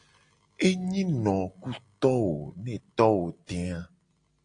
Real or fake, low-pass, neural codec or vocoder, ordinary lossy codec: real; 9.9 kHz; none; AAC, 48 kbps